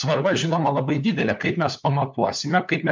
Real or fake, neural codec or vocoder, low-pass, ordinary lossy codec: fake; codec, 16 kHz, 4 kbps, FunCodec, trained on LibriTTS, 50 frames a second; 7.2 kHz; MP3, 64 kbps